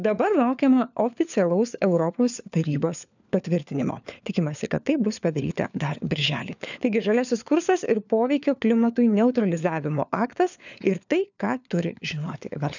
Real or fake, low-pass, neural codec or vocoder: fake; 7.2 kHz; codec, 16 kHz, 4 kbps, FunCodec, trained on LibriTTS, 50 frames a second